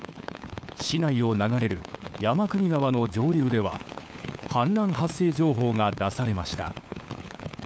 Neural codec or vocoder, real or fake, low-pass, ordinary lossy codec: codec, 16 kHz, 4 kbps, FunCodec, trained on LibriTTS, 50 frames a second; fake; none; none